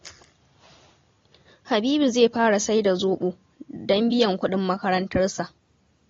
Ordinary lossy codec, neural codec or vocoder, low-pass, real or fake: AAC, 32 kbps; none; 7.2 kHz; real